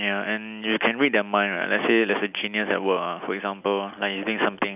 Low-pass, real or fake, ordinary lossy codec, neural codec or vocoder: 3.6 kHz; real; none; none